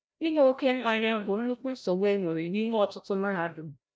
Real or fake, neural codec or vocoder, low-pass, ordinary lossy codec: fake; codec, 16 kHz, 0.5 kbps, FreqCodec, larger model; none; none